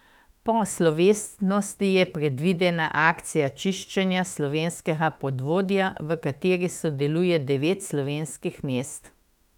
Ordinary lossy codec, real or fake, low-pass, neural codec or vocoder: none; fake; 19.8 kHz; autoencoder, 48 kHz, 32 numbers a frame, DAC-VAE, trained on Japanese speech